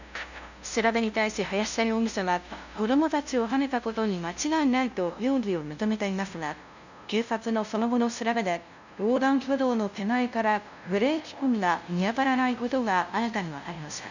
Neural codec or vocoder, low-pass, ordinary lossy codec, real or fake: codec, 16 kHz, 0.5 kbps, FunCodec, trained on LibriTTS, 25 frames a second; 7.2 kHz; none; fake